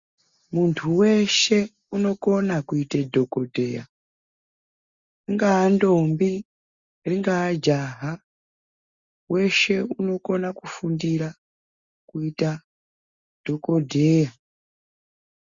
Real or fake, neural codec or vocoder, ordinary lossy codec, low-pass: real; none; Opus, 64 kbps; 7.2 kHz